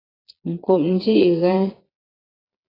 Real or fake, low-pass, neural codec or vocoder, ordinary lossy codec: fake; 5.4 kHz; vocoder, 22.05 kHz, 80 mel bands, Vocos; AAC, 24 kbps